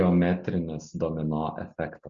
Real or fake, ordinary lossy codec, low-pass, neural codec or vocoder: real; Opus, 64 kbps; 7.2 kHz; none